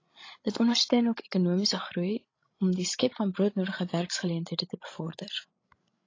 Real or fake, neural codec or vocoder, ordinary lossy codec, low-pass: fake; codec, 16 kHz, 16 kbps, FreqCodec, larger model; AAC, 32 kbps; 7.2 kHz